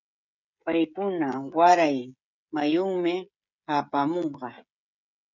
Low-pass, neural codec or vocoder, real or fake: 7.2 kHz; codec, 16 kHz, 16 kbps, FreqCodec, smaller model; fake